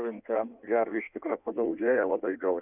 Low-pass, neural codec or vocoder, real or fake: 3.6 kHz; codec, 16 kHz in and 24 kHz out, 1.1 kbps, FireRedTTS-2 codec; fake